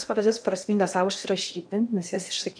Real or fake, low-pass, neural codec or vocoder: fake; 9.9 kHz; codec, 16 kHz in and 24 kHz out, 0.8 kbps, FocalCodec, streaming, 65536 codes